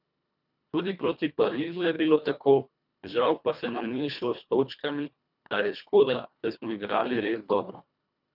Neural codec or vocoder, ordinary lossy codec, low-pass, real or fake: codec, 24 kHz, 1.5 kbps, HILCodec; none; 5.4 kHz; fake